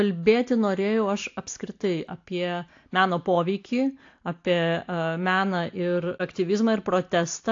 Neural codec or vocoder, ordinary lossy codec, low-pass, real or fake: none; AAC, 48 kbps; 7.2 kHz; real